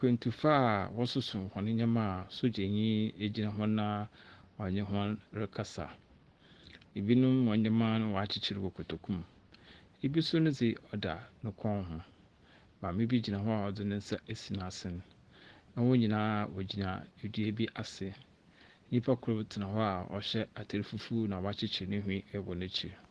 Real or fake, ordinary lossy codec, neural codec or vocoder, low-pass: real; Opus, 16 kbps; none; 10.8 kHz